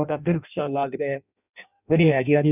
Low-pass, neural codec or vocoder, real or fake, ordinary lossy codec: 3.6 kHz; codec, 16 kHz in and 24 kHz out, 0.6 kbps, FireRedTTS-2 codec; fake; none